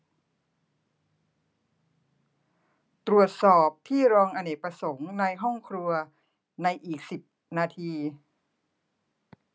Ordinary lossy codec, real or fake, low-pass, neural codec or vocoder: none; real; none; none